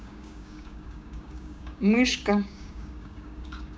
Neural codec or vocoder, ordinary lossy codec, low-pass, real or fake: codec, 16 kHz, 6 kbps, DAC; none; none; fake